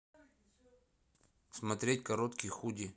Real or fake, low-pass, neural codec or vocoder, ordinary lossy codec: real; none; none; none